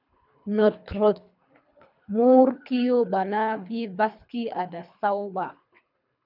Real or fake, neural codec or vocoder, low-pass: fake; codec, 24 kHz, 3 kbps, HILCodec; 5.4 kHz